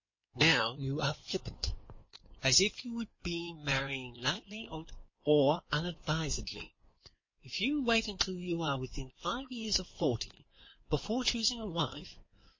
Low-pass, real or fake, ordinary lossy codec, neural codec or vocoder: 7.2 kHz; fake; MP3, 32 kbps; codec, 16 kHz in and 24 kHz out, 2.2 kbps, FireRedTTS-2 codec